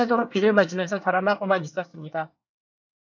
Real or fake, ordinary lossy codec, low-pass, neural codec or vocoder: fake; MP3, 64 kbps; 7.2 kHz; codec, 24 kHz, 1 kbps, SNAC